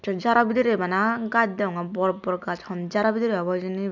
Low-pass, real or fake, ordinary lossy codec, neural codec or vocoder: 7.2 kHz; real; none; none